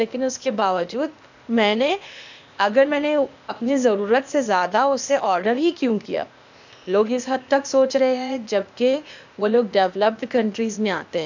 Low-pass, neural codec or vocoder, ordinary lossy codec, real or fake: 7.2 kHz; codec, 16 kHz, 0.8 kbps, ZipCodec; none; fake